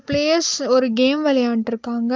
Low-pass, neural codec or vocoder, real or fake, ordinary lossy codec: 7.2 kHz; none; real; Opus, 32 kbps